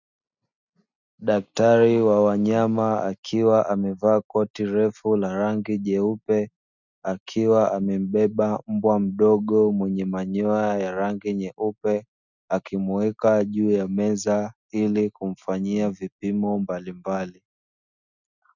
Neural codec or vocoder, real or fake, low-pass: none; real; 7.2 kHz